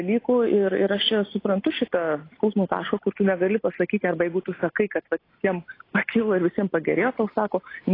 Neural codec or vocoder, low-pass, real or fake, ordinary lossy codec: none; 5.4 kHz; real; AAC, 24 kbps